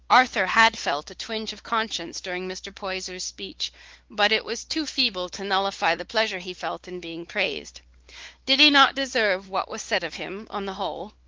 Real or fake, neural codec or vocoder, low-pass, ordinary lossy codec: fake; codec, 16 kHz, 4 kbps, X-Codec, WavLM features, trained on Multilingual LibriSpeech; 7.2 kHz; Opus, 16 kbps